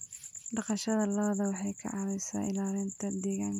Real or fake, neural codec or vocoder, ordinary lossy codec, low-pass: real; none; none; 19.8 kHz